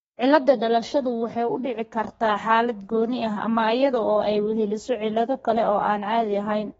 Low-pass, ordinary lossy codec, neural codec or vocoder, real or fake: 14.4 kHz; AAC, 24 kbps; codec, 32 kHz, 1.9 kbps, SNAC; fake